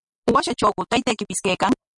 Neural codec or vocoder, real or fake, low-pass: none; real; 10.8 kHz